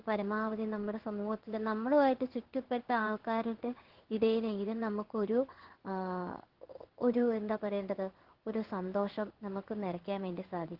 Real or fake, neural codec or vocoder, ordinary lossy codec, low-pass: fake; codec, 16 kHz in and 24 kHz out, 1 kbps, XY-Tokenizer; Opus, 16 kbps; 5.4 kHz